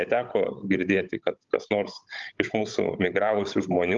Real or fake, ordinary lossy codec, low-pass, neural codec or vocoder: fake; Opus, 32 kbps; 7.2 kHz; codec, 16 kHz, 16 kbps, FunCodec, trained on Chinese and English, 50 frames a second